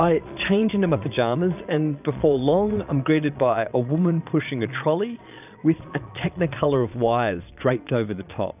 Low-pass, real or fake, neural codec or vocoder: 3.6 kHz; fake; vocoder, 22.05 kHz, 80 mel bands, Vocos